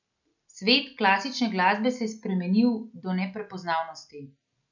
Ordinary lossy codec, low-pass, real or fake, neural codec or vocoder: none; 7.2 kHz; real; none